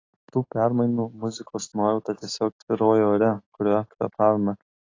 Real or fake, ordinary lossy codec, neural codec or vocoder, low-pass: real; AAC, 32 kbps; none; 7.2 kHz